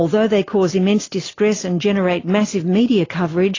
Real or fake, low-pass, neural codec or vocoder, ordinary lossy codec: real; 7.2 kHz; none; AAC, 32 kbps